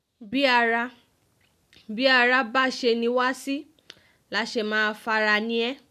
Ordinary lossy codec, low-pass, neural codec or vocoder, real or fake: none; 14.4 kHz; none; real